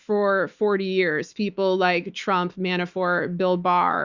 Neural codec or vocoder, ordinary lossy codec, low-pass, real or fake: autoencoder, 48 kHz, 128 numbers a frame, DAC-VAE, trained on Japanese speech; Opus, 64 kbps; 7.2 kHz; fake